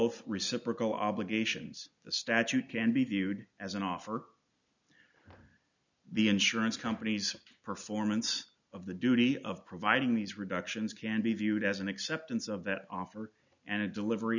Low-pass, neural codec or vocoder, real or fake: 7.2 kHz; none; real